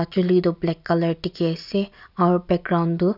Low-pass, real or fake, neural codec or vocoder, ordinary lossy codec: 5.4 kHz; real; none; none